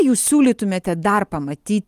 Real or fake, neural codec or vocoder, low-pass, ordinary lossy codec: real; none; 14.4 kHz; Opus, 24 kbps